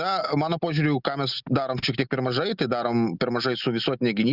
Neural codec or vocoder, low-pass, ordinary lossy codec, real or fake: none; 5.4 kHz; Opus, 64 kbps; real